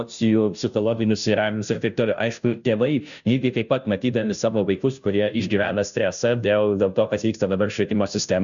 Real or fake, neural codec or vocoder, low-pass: fake; codec, 16 kHz, 0.5 kbps, FunCodec, trained on Chinese and English, 25 frames a second; 7.2 kHz